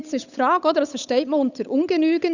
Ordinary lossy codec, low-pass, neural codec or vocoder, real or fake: none; 7.2 kHz; codec, 16 kHz, 8 kbps, FunCodec, trained on Chinese and English, 25 frames a second; fake